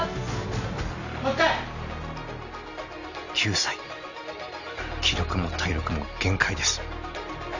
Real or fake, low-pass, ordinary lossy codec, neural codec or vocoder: real; 7.2 kHz; none; none